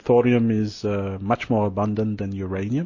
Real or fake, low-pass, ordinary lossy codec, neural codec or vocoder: real; 7.2 kHz; MP3, 32 kbps; none